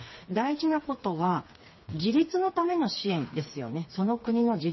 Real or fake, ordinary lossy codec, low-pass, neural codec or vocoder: fake; MP3, 24 kbps; 7.2 kHz; codec, 16 kHz, 4 kbps, FreqCodec, smaller model